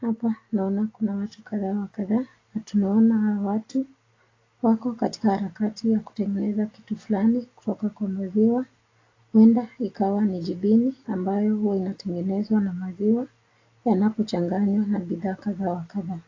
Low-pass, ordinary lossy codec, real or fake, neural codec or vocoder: 7.2 kHz; AAC, 32 kbps; real; none